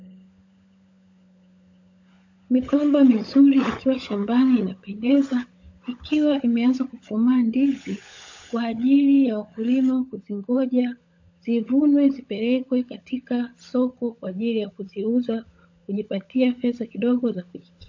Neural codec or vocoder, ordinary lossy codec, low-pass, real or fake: codec, 16 kHz, 16 kbps, FunCodec, trained on LibriTTS, 50 frames a second; AAC, 48 kbps; 7.2 kHz; fake